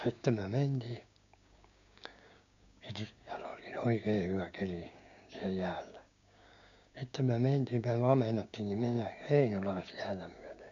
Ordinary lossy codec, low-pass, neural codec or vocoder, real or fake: none; 7.2 kHz; codec, 16 kHz, 6 kbps, DAC; fake